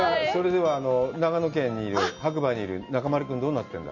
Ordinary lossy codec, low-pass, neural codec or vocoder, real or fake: MP3, 64 kbps; 7.2 kHz; none; real